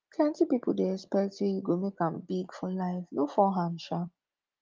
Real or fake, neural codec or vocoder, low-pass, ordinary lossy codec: real; none; 7.2 kHz; Opus, 24 kbps